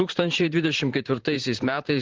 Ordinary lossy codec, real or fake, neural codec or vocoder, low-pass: Opus, 16 kbps; fake; vocoder, 44.1 kHz, 128 mel bands, Pupu-Vocoder; 7.2 kHz